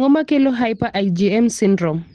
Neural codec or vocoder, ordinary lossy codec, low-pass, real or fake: none; Opus, 16 kbps; 9.9 kHz; real